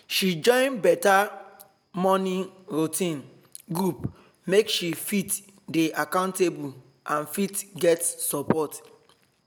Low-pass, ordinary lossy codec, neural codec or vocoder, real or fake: none; none; none; real